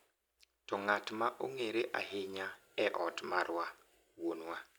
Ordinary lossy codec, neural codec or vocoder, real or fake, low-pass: none; none; real; none